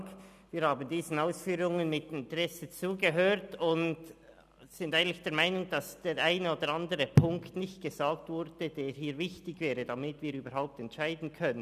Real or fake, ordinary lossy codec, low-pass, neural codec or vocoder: real; none; 14.4 kHz; none